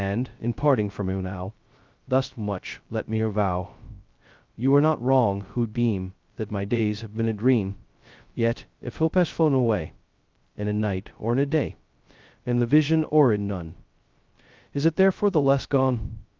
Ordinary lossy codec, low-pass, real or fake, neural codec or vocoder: Opus, 24 kbps; 7.2 kHz; fake; codec, 16 kHz, 0.2 kbps, FocalCodec